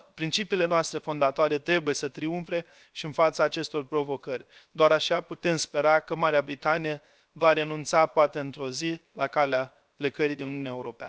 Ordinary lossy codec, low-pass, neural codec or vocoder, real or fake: none; none; codec, 16 kHz, 0.7 kbps, FocalCodec; fake